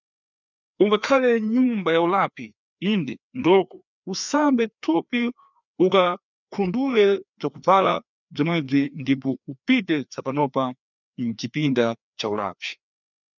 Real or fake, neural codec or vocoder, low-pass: fake; codec, 16 kHz, 2 kbps, FreqCodec, larger model; 7.2 kHz